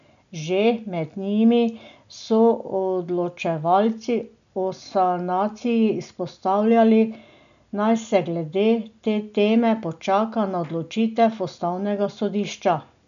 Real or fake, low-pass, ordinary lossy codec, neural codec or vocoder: real; 7.2 kHz; none; none